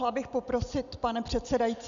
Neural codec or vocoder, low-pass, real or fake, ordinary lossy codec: none; 7.2 kHz; real; MP3, 96 kbps